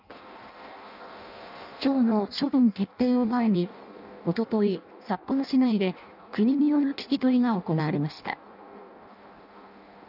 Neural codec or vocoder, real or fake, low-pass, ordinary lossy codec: codec, 16 kHz in and 24 kHz out, 0.6 kbps, FireRedTTS-2 codec; fake; 5.4 kHz; none